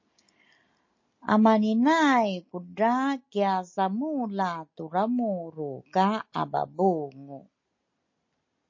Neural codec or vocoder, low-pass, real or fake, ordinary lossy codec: none; 7.2 kHz; real; MP3, 32 kbps